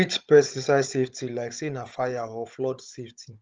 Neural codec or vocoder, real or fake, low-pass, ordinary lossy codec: codec, 16 kHz, 16 kbps, FunCodec, trained on Chinese and English, 50 frames a second; fake; 7.2 kHz; Opus, 24 kbps